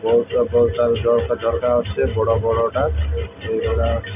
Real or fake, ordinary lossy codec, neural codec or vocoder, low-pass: real; none; none; 3.6 kHz